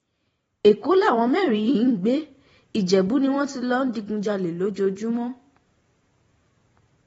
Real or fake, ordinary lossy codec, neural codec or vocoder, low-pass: fake; AAC, 24 kbps; vocoder, 44.1 kHz, 128 mel bands every 512 samples, BigVGAN v2; 19.8 kHz